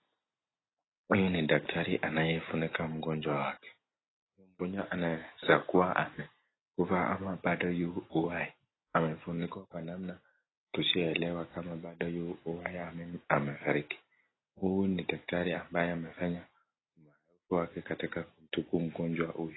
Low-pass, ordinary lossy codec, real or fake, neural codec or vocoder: 7.2 kHz; AAC, 16 kbps; real; none